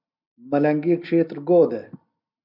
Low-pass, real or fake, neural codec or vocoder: 5.4 kHz; real; none